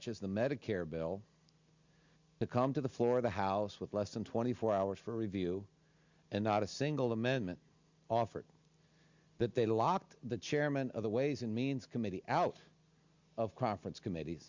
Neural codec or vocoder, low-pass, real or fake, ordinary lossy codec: none; 7.2 kHz; real; Opus, 64 kbps